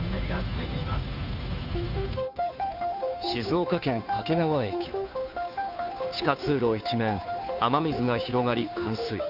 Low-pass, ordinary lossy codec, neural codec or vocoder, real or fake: 5.4 kHz; none; codec, 16 kHz, 2 kbps, FunCodec, trained on Chinese and English, 25 frames a second; fake